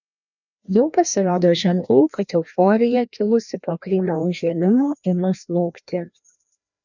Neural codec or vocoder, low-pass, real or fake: codec, 16 kHz, 1 kbps, FreqCodec, larger model; 7.2 kHz; fake